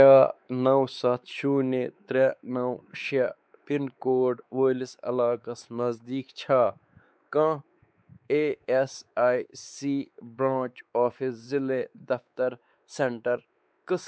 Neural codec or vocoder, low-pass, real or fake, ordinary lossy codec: codec, 16 kHz, 4 kbps, X-Codec, WavLM features, trained on Multilingual LibriSpeech; none; fake; none